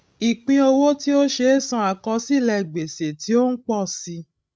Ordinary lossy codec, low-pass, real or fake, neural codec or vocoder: none; none; fake; codec, 16 kHz, 16 kbps, FreqCodec, larger model